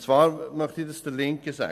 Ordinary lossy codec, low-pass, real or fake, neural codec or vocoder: AAC, 96 kbps; 14.4 kHz; real; none